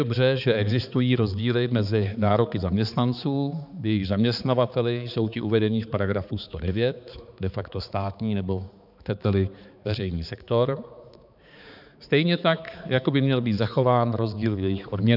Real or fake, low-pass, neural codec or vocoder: fake; 5.4 kHz; codec, 16 kHz, 4 kbps, X-Codec, HuBERT features, trained on balanced general audio